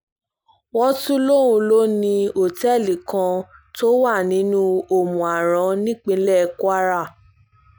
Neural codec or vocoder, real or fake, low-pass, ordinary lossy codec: none; real; none; none